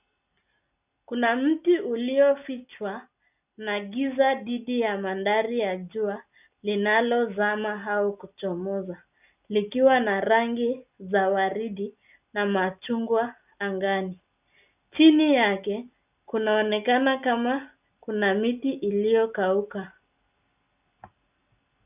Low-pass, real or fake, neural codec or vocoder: 3.6 kHz; real; none